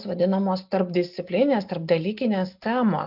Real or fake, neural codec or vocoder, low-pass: real; none; 5.4 kHz